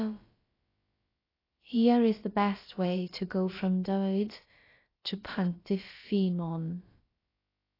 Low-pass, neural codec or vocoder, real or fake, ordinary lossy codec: 5.4 kHz; codec, 16 kHz, about 1 kbps, DyCAST, with the encoder's durations; fake; AAC, 24 kbps